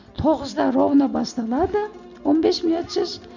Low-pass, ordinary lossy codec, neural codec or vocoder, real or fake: 7.2 kHz; none; none; real